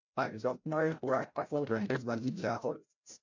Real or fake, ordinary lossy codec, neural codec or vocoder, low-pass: fake; MP3, 48 kbps; codec, 16 kHz, 0.5 kbps, FreqCodec, larger model; 7.2 kHz